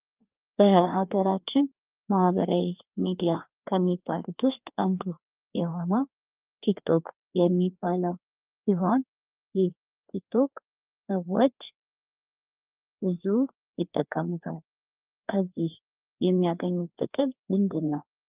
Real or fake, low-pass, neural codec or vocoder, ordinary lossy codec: fake; 3.6 kHz; codec, 16 kHz, 2 kbps, FreqCodec, larger model; Opus, 32 kbps